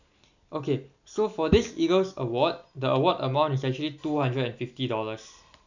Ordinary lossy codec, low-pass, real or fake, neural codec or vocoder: none; 7.2 kHz; real; none